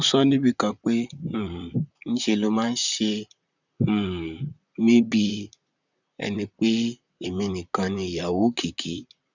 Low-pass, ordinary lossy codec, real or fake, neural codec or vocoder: 7.2 kHz; none; fake; vocoder, 44.1 kHz, 128 mel bands, Pupu-Vocoder